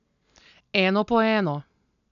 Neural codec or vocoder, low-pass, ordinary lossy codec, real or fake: none; 7.2 kHz; none; real